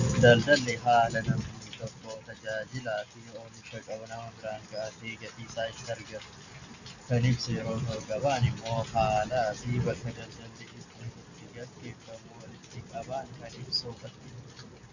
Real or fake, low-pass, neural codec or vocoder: real; 7.2 kHz; none